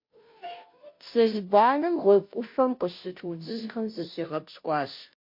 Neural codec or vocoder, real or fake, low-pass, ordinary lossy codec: codec, 16 kHz, 0.5 kbps, FunCodec, trained on Chinese and English, 25 frames a second; fake; 5.4 kHz; MP3, 32 kbps